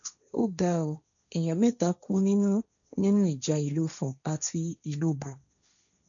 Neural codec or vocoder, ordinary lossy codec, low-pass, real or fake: codec, 16 kHz, 1.1 kbps, Voila-Tokenizer; none; 7.2 kHz; fake